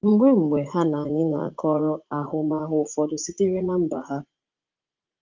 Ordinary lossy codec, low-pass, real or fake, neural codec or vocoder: Opus, 32 kbps; 7.2 kHz; fake; vocoder, 44.1 kHz, 80 mel bands, Vocos